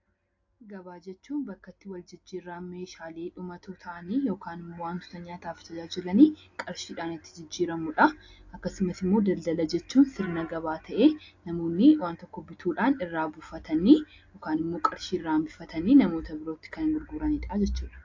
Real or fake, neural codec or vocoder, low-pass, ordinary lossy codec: real; none; 7.2 kHz; AAC, 48 kbps